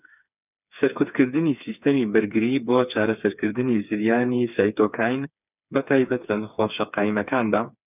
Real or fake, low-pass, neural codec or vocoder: fake; 3.6 kHz; codec, 16 kHz, 4 kbps, FreqCodec, smaller model